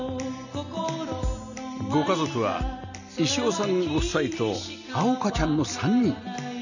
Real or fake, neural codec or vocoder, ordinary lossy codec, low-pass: real; none; none; 7.2 kHz